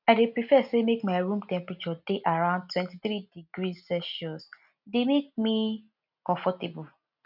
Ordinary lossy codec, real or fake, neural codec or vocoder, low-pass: none; real; none; 5.4 kHz